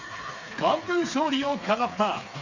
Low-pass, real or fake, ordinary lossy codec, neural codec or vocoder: 7.2 kHz; fake; Opus, 64 kbps; codec, 16 kHz, 4 kbps, FreqCodec, smaller model